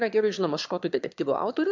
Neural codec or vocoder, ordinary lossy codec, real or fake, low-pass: autoencoder, 22.05 kHz, a latent of 192 numbers a frame, VITS, trained on one speaker; MP3, 64 kbps; fake; 7.2 kHz